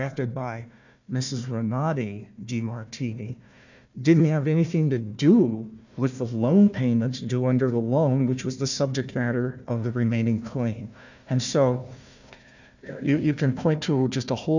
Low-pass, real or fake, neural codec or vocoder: 7.2 kHz; fake; codec, 16 kHz, 1 kbps, FunCodec, trained on Chinese and English, 50 frames a second